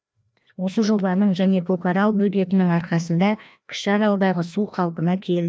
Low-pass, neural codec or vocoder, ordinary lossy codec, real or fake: none; codec, 16 kHz, 1 kbps, FreqCodec, larger model; none; fake